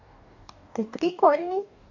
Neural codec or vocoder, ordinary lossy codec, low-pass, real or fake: codec, 44.1 kHz, 2.6 kbps, DAC; none; 7.2 kHz; fake